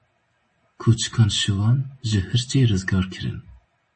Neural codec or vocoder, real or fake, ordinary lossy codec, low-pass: none; real; MP3, 32 kbps; 9.9 kHz